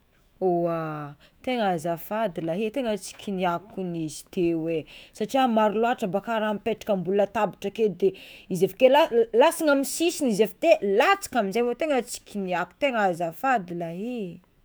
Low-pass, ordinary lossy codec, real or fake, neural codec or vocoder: none; none; fake; autoencoder, 48 kHz, 128 numbers a frame, DAC-VAE, trained on Japanese speech